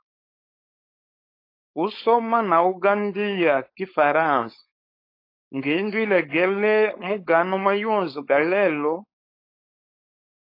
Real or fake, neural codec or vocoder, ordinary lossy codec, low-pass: fake; codec, 16 kHz, 4.8 kbps, FACodec; AAC, 32 kbps; 5.4 kHz